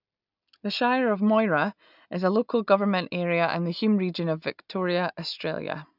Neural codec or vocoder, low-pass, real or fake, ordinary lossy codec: none; 5.4 kHz; real; none